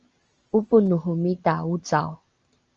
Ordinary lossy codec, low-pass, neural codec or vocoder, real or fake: Opus, 24 kbps; 7.2 kHz; none; real